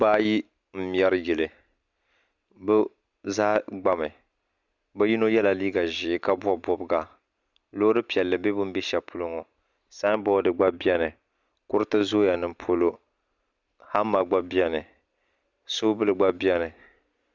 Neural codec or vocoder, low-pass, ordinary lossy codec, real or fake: none; 7.2 kHz; Opus, 64 kbps; real